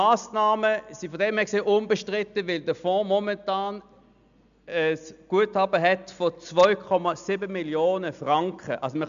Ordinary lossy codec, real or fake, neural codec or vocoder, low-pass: AAC, 96 kbps; real; none; 7.2 kHz